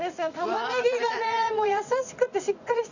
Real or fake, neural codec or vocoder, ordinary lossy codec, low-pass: fake; vocoder, 44.1 kHz, 80 mel bands, Vocos; none; 7.2 kHz